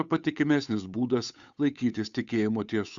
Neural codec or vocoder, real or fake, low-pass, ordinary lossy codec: codec, 16 kHz, 8 kbps, FunCodec, trained on Chinese and English, 25 frames a second; fake; 7.2 kHz; Opus, 64 kbps